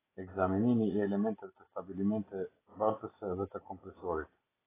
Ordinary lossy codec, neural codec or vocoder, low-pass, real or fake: AAC, 16 kbps; none; 3.6 kHz; real